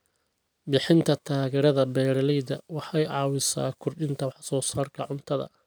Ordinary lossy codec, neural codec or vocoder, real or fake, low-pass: none; none; real; none